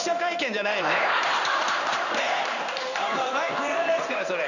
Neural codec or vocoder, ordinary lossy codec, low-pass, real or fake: codec, 16 kHz in and 24 kHz out, 1 kbps, XY-Tokenizer; none; 7.2 kHz; fake